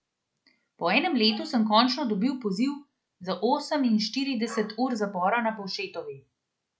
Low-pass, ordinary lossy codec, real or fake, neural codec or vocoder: none; none; real; none